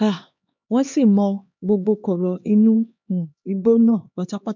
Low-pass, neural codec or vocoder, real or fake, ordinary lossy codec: 7.2 kHz; codec, 16 kHz, 2 kbps, X-Codec, HuBERT features, trained on LibriSpeech; fake; MP3, 64 kbps